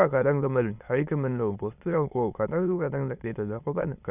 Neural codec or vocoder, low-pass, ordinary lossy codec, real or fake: autoencoder, 22.05 kHz, a latent of 192 numbers a frame, VITS, trained on many speakers; 3.6 kHz; none; fake